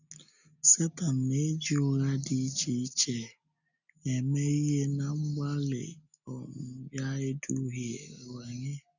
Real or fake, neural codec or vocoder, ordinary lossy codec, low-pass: real; none; none; 7.2 kHz